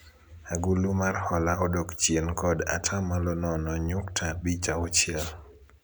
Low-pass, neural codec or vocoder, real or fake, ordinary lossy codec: none; none; real; none